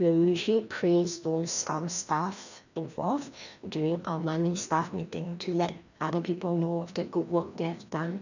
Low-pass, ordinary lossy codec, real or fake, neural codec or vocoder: 7.2 kHz; none; fake; codec, 16 kHz, 1 kbps, FreqCodec, larger model